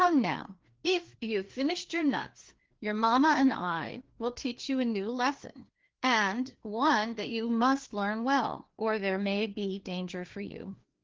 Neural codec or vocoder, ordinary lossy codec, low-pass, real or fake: codec, 16 kHz, 2 kbps, FreqCodec, larger model; Opus, 16 kbps; 7.2 kHz; fake